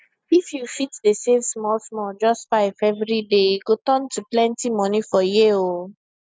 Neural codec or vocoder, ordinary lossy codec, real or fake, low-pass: none; none; real; none